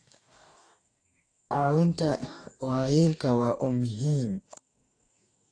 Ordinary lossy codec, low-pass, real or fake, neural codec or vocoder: AAC, 48 kbps; 9.9 kHz; fake; codec, 44.1 kHz, 2.6 kbps, DAC